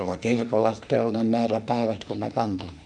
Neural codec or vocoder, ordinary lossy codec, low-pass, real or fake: autoencoder, 48 kHz, 32 numbers a frame, DAC-VAE, trained on Japanese speech; none; 10.8 kHz; fake